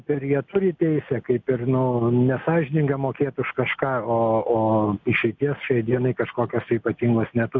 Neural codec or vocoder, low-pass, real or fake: none; 7.2 kHz; real